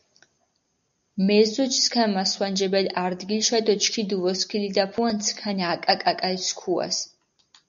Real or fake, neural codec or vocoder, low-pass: real; none; 7.2 kHz